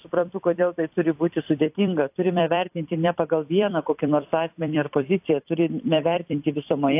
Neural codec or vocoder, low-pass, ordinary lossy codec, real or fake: vocoder, 44.1 kHz, 128 mel bands every 256 samples, BigVGAN v2; 3.6 kHz; AAC, 32 kbps; fake